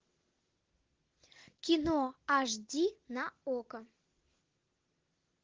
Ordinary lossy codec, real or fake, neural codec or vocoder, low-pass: Opus, 16 kbps; real; none; 7.2 kHz